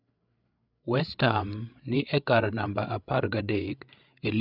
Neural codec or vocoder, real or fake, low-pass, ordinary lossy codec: codec, 16 kHz, 8 kbps, FreqCodec, larger model; fake; 5.4 kHz; none